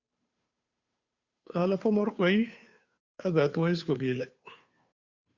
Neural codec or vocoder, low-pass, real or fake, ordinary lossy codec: codec, 16 kHz, 2 kbps, FunCodec, trained on Chinese and English, 25 frames a second; 7.2 kHz; fake; Opus, 64 kbps